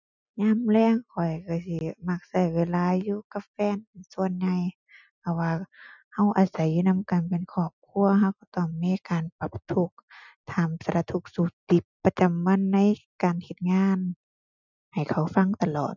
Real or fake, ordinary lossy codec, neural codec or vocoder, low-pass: real; none; none; 7.2 kHz